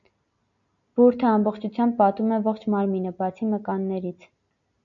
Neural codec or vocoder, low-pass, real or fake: none; 7.2 kHz; real